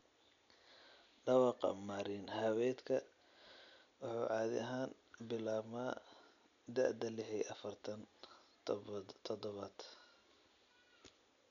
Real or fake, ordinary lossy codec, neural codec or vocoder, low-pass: real; none; none; 7.2 kHz